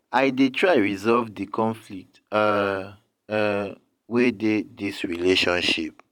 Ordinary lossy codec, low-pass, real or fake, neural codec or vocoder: none; 19.8 kHz; fake; vocoder, 44.1 kHz, 128 mel bands every 512 samples, BigVGAN v2